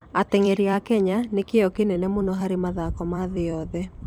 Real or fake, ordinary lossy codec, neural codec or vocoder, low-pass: fake; none; vocoder, 44.1 kHz, 128 mel bands every 256 samples, BigVGAN v2; 19.8 kHz